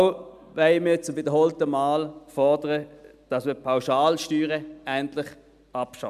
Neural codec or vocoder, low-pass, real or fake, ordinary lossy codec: none; 14.4 kHz; real; MP3, 96 kbps